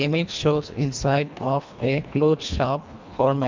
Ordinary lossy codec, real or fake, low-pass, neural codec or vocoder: MP3, 64 kbps; fake; 7.2 kHz; codec, 24 kHz, 1.5 kbps, HILCodec